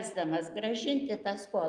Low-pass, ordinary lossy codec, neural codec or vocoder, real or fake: 10.8 kHz; Opus, 32 kbps; none; real